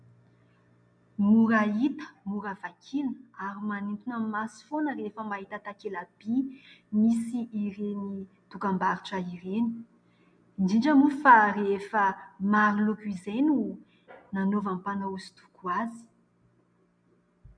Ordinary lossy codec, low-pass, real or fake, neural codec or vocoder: MP3, 96 kbps; 9.9 kHz; real; none